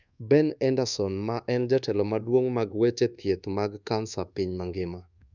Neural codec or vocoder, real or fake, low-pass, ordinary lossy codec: codec, 24 kHz, 1.2 kbps, DualCodec; fake; 7.2 kHz; none